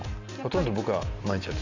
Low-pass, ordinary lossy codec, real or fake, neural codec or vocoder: 7.2 kHz; AAC, 48 kbps; real; none